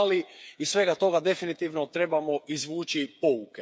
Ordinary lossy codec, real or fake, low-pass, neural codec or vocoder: none; fake; none; codec, 16 kHz, 8 kbps, FreqCodec, smaller model